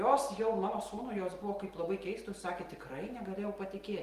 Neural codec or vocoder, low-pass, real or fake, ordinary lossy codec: none; 14.4 kHz; real; Opus, 24 kbps